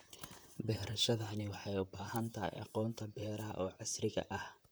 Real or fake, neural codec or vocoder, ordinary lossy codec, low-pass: fake; vocoder, 44.1 kHz, 128 mel bands, Pupu-Vocoder; none; none